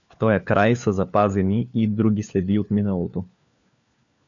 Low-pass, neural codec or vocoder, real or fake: 7.2 kHz; codec, 16 kHz, 4 kbps, FunCodec, trained on LibriTTS, 50 frames a second; fake